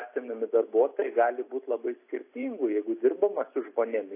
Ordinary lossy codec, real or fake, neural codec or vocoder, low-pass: AAC, 24 kbps; real; none; 3.6 kHz